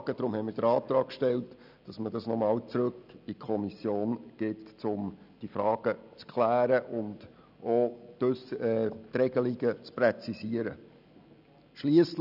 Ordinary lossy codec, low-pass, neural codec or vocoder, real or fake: none; 5.4 kHz; none; real